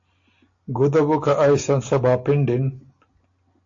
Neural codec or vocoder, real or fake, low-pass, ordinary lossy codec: none; real; 7.2 kHz; AAC, 48 kbps